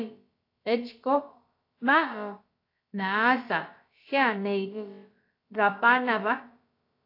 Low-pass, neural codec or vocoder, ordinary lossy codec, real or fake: 5.4 kHz; codec, 16 kHz, about 1 kbps, DyCAST, with the encoder's durations; AAC, 32 kbps; fake